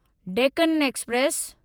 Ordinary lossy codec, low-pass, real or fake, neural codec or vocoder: none; none; fake; vocoder, 48 kHz, 128 mel bands, Vocos